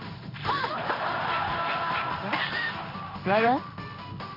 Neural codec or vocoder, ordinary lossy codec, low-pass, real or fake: codec, 16 kHz in and 24 kHz out, 1 kbps, XY-Tokenizer; none; 5.4 kHz; fake